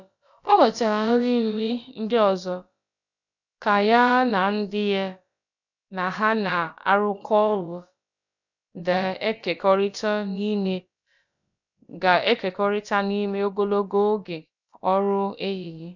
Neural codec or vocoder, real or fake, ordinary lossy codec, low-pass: codec, 16 kHz, about 1 kbps, DyCAST, with the encoder's durations; fake; none; 7.2 kHz